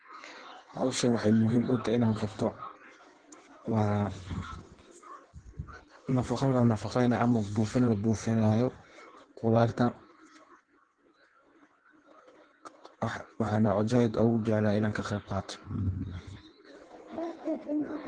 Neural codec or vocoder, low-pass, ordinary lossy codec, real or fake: codec, 16 kHz in and 24 kHz out, 1.1 kbps, FireRedTTS-2 codec; 9.9 kHz; Opus, 16 kbps; fake